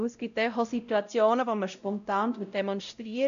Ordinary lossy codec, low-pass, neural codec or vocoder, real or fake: none; 7.2 kHz; codec, 16 kHz, 0.5 kbps, X-Codec, WavLM features, trained on Multilingual LibriSpeech; fake